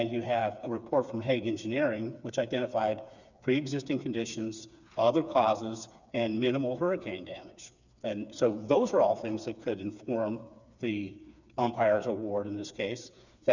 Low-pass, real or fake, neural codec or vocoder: 7.2 kHz; fake; codec, 16 kHz, 4 kbps, FreqCodec, smaller model